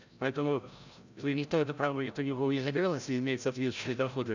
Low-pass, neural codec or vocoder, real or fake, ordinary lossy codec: 7.2 kHz; codec, 16 kHz, 0.5 kbps, FreqCodec, larger model; fake; none